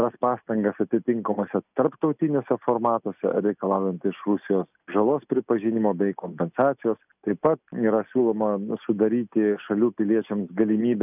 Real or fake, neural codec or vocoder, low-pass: real; none; 3.6 kHz